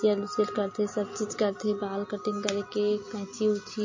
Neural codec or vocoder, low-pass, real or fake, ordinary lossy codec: none; 7.2 kHz; real; MP3, 32 kbps